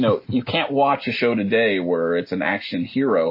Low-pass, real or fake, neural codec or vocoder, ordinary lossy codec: 5.4 kHz; real; none; MP3, 24 kbps